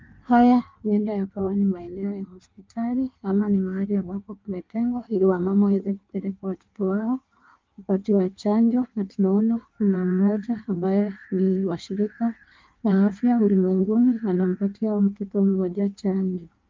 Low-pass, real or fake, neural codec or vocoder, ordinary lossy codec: 7.2 kHz; fake; codec, 16 kHz in and 24 kHz out, 1.1 kbps, FireRedTTS-2 codec; Opus, 32 kbps